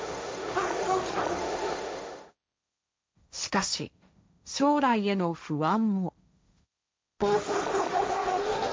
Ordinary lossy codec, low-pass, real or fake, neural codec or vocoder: none; none; fake; codec, 16 kHz, 1.1 kbps, Voila-Tokenizer